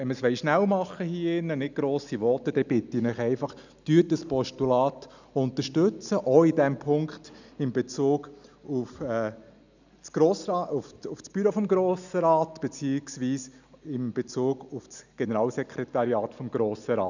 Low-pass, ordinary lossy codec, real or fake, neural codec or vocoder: 7.2 kHz; none; real; none